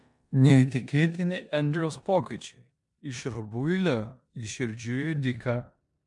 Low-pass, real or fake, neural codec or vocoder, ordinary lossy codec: 10.8 kHz; fake; codec, 16 kHz in and 24 kHz out, 0.9 kbps, LongCat-Audio-Codec, four codebook decoder; MP3, 64 kbps